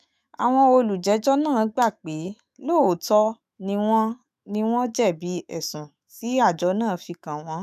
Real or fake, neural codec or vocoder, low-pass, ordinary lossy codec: fake; autoencoder, 48 kHz, 128 numbers a frame, DAC-VAE, trained on Japanese speech; 14.4 kHz; none